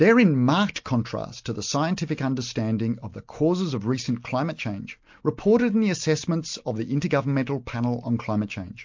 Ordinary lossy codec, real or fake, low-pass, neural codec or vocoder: MP3, 48 kbps; real; 7.2 kHz; none